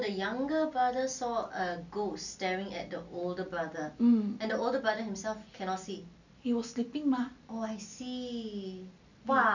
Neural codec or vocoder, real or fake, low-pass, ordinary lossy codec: none; real; 7.2 kHz; none